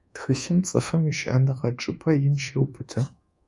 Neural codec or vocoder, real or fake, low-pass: codec, 24 kHz, 1.2 kbps, DualCodec; fake; 10.8 kHz